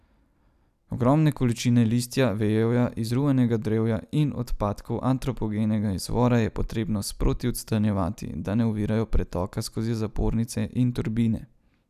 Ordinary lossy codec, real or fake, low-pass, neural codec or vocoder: none; real; 14.4 kHz; none